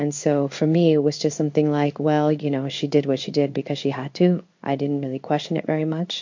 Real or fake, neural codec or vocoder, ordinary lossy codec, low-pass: fake; codec, 16 kHz in and 24 kHz out, 1 kbps, XY-Tokenizer; MP3, 48 kbps; 7.2 kHz